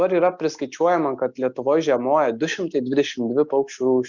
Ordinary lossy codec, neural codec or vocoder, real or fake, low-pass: Opus, 64 kbps; none; real; 7.2 kHz